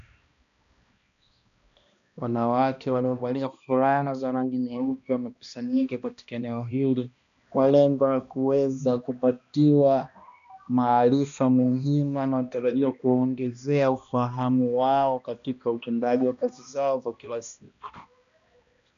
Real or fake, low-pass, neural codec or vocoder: fake; 7.2 kHz; codec, 16 kHz, 1 kbps, X-Codec, HuBERT features, trained on balanced general audio